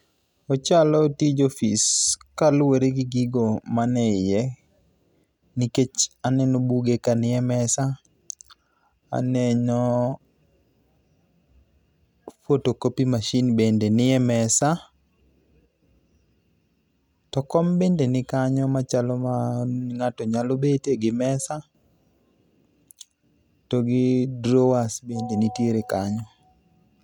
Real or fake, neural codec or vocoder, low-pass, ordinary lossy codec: real; none; 19.8 kHz; none